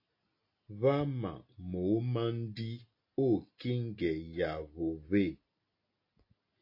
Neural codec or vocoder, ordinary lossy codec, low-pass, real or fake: none; AAC, 32 kbps; 5.4 kHz; real